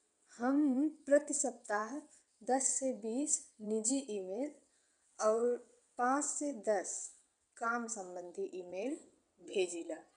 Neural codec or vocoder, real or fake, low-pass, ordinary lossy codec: vocoder, 22.05 kHz, 80 mel bands, WaveNeXt; fake; 9.9 kHz; none